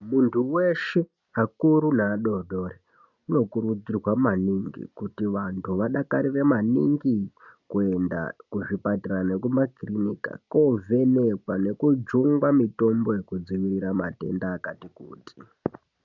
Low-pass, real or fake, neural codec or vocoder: 7.2 kHz; real; none